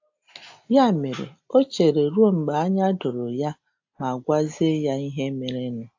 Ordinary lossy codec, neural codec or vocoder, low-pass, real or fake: AAC, 48 kbps; none; 7.2 kHz; real